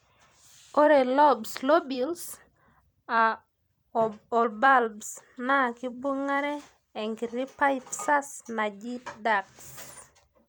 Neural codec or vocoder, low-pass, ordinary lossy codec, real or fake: vocoder, 44.1 kHz, 128 mel bands every 256 samples, BigVGAN v2; none; none; fake